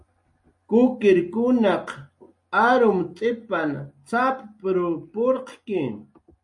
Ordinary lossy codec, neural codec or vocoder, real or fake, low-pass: MP3, 48 kbps; none; real; 10.8 kHz